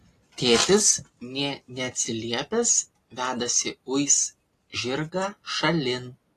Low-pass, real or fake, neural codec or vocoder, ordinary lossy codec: 14.4 kHz; real; none; AAC, 48 kbps